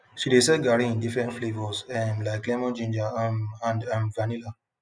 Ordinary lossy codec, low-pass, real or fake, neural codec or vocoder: none; none; real; none